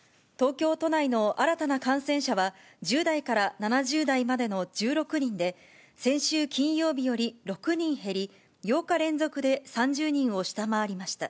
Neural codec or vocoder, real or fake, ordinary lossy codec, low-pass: none; real; none; none